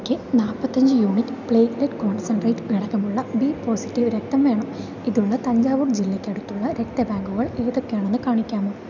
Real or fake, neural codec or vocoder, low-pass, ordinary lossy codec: real; none; 7.2 kHz; none